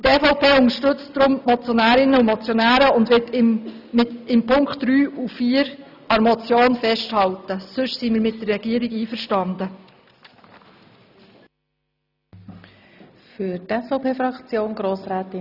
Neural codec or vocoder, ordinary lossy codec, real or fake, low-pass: none; none; real; 5.4 kHz